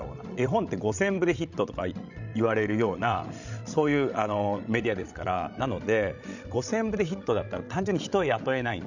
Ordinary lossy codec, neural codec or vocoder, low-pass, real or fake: none; codec, 16 kHz, 16 kbps, FreqCodec, larger model; 7.2 kHz; fake